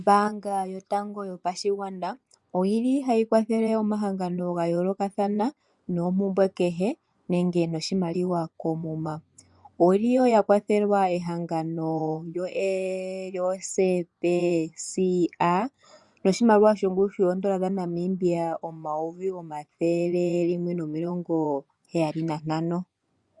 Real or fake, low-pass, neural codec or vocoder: fake; 10.8 kHz; vocoder, 24 kHz, 100 mel bands, Vocos